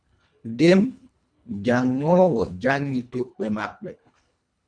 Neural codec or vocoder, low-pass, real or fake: codec, 24 kHz, 1.5 kbps, HILCodec; 9.9 kHz; fake